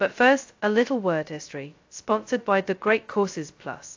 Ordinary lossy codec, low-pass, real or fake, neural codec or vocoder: AAC, 48 kbps; 7.2 kHz; fake; codec, 16 kHz, 0.2 kbps, FocalCodec